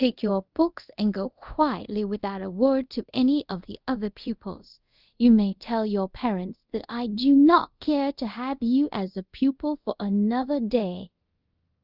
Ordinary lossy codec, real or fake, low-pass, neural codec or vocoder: Opus, 24 kbps; fake; 5.4 kHz; codec, 24 kHz, 0.5 kbps, DualCodec